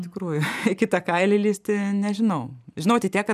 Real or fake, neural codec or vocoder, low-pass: fake; vocoder, 44.1 kHz, 128 mel bands every 512 samples, BigVGAN v2; 14.4 kHz